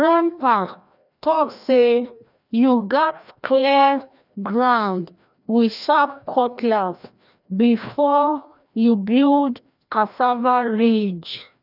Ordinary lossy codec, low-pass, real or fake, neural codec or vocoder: none; 5.4 kHz; fake; codec, 16 kHz, 1 kbps, FreqCodec, larger model